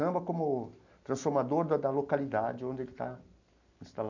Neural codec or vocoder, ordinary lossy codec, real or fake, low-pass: none; none; real; 7.2 kHz